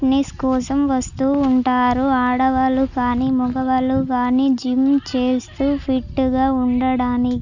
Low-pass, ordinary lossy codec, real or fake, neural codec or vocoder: 7.2 kHz; none; real; none